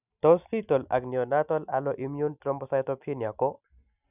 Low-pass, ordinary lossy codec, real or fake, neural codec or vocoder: 3.6 kHz; none; real; none